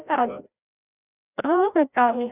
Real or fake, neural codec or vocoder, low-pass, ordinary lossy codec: fake; codec, 16 kHz, 0.5 kbps, FreqCodec, larger model; 3.6 kHz; none